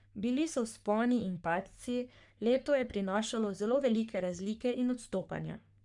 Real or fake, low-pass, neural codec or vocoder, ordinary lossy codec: fake; 10.8 kHz; codec, 44.1 kHz, 3.4 kbps, Pupu-Codec; none